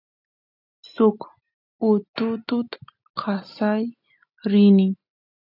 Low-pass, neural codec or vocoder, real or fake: 5.4 kHz; none; real